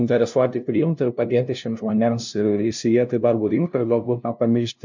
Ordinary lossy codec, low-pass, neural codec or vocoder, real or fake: MP3, 64 kbps; 7.2 kHz; codec, 16 kHz, 0.5 kbps, FunCodec, trained on LibriTTS, 25 frames a second; fake